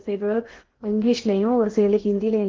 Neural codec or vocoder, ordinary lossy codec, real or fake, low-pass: codec, 16 kHz in and 24 kHz out, 0.6 kbps, FocalCodec, streaming, 4096 codes; Opus, 16 kbps; fake; 7.2 kHz